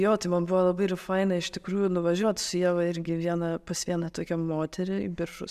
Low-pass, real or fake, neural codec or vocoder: 14.4 kHz; real; none